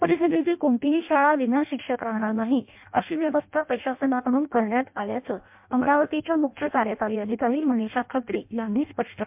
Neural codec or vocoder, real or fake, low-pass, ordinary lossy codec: codec, 16 kHz in and 24 kHz out, 0.6 kbps, FireRedTTS-2 codec; fake; 3.6 kHz; MP3, 32 kbps